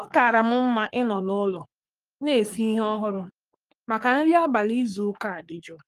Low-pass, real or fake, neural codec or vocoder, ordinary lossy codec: 14.4 kHz; fake; codec, 44.1 kHz, 3.4 kbps, Pupu-Codec; Opus, 32 kbps